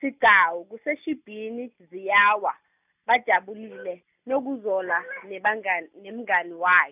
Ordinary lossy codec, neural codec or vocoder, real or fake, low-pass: none; none; real; 3.6 kHz